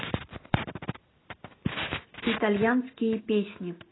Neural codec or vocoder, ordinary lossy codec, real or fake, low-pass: none; AAC, 16 kbps; real; 7.2 kHz